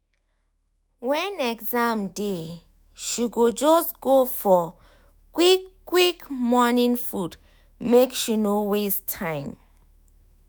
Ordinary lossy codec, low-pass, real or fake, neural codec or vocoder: none; none; fake; autoencoder, 48 kHz, 128 numbers a frame, DAC-VAE, trained on Japanese speech